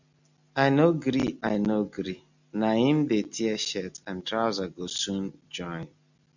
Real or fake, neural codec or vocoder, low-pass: real; none; 7.2 kHz